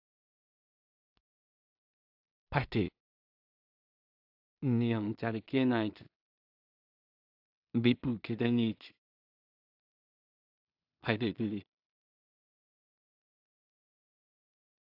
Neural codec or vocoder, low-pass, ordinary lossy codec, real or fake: codec, 16 kHz in and 24 kHz out, 0.4 kbps, LongCat-Audio-Codec, two codebook decoder; 5.4 kHz; AAC, 48 kbps; fake